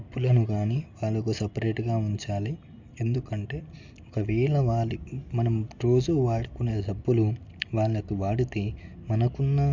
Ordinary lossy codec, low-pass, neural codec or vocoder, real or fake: MP3, 64 kbps; 7.2 kHz; none; real